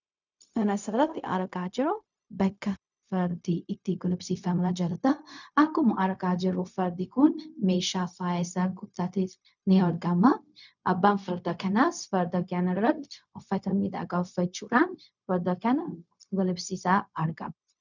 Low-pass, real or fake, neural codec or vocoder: 7.2 kHz; fake; codec, 16 kHz, 0.4 kbps, LongCat-Audio-Codec